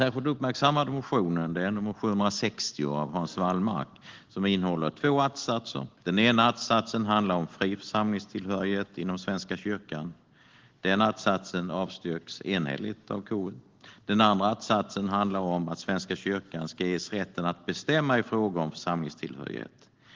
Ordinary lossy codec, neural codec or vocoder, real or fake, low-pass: Opus, 16 kbps; none; real; 7.2 kHz